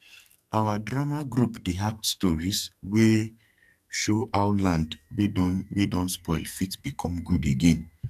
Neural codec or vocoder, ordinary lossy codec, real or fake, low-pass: codec, 44.1 kHz, 2.6 kbps, SNAC; none; fake; 14.4 kHz